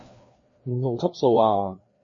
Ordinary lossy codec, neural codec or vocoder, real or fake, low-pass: MP3, 32 kbps; codec, 16 kHz, 2 kbps, FreqCodec, larger model; fake; 7.2 kHz